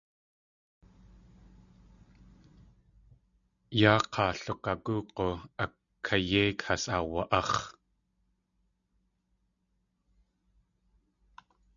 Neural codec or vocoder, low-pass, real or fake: none; 7.2 kHz; real